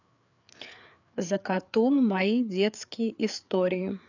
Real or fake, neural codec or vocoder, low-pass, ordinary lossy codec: fake; codec, 16 kHz, 4 kbps, FreqCodec, larger model; 7.2 kHz; none